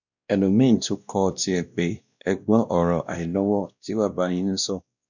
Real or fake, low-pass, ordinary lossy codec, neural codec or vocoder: fake; 7.2 kHz; none; codec, 16 kHz, 1 kbps, X-Codec, WavLM features, trained on Multilingual LibriSpeech